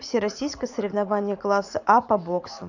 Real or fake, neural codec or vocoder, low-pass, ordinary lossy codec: fake; vocoder, 44.1 kHz, 80 mel bands, Vocos; 7.2 kHz; none